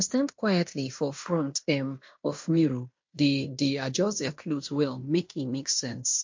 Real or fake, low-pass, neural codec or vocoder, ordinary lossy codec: fake; 7.2 kHz; codec, 16 kHz in and 24 kHz out, 0.9 kbps, LongCat-Audio-Codec, fine tuned four codebook decoder; MP3, 48 kbps